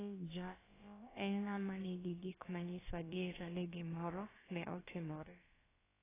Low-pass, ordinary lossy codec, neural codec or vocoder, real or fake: 3.6 kHz; AAC, 16 kbps; codec, 16 kHz, about 1 kbps, DyCAST, with the encoder's durations; fake